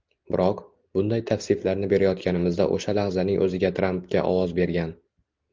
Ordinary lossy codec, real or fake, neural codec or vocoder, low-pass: Opus, 32 kbps; real; none; 7.2 kHz